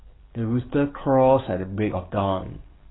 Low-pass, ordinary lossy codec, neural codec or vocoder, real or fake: 7.2 kHz; AAC, 16 kbps; codec, 44.1 kHz, 7.8 kbps, DAC; fake